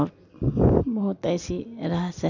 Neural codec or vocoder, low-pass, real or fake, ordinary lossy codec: none; 7.2 kHz; real; none